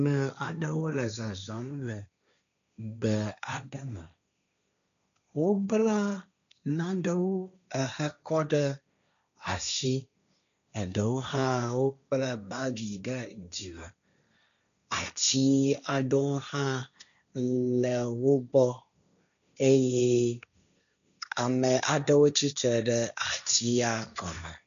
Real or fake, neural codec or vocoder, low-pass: fake; codec, 16 kHz, 1.1 kbps, Voila-Tokenizer; 7.2 kHz